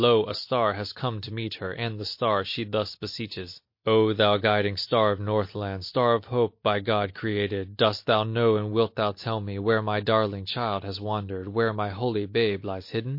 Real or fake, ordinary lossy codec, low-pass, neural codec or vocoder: real; MP3, 32 kbps; 5.4 kHz; none